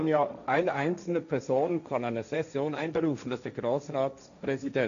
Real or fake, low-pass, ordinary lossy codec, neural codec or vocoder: fake; 7.2 kHz; none; codec, 16 kHz, 1.1 kbps, Voila-Tokenizer